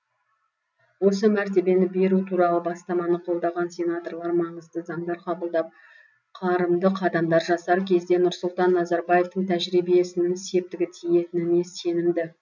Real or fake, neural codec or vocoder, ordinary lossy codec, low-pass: real; none; none; 7.2 kHz